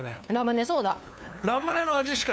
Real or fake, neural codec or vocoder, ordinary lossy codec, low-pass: fake; codec, 16 kHz, 2 kbps, FunCodec, trained on LibriTTS, 25 frames a second; none; none